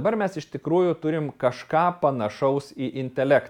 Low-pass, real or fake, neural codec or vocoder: 19.8 kHz; real; none